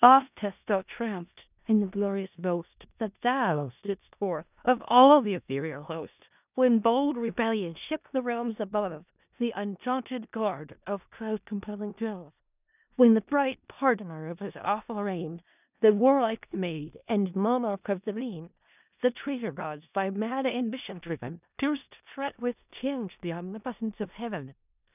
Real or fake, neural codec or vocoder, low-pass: fake; codec, 16 kHz in and 24 kHz out, 0.4 kbps, LongCat-Audio-Codec, four codebook decoder; 3.6 kHz